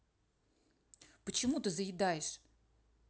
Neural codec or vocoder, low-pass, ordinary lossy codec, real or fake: none; none; none; real